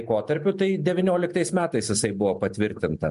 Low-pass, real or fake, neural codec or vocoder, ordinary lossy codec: 10.8 kHz; real; none; MP3, 64 kbps